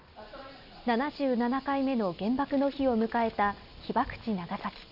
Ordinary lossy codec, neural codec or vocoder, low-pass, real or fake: none; none; 5.4 kHz; real